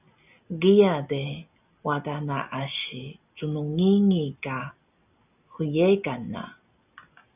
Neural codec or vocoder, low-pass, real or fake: none; 3.6 kHz; real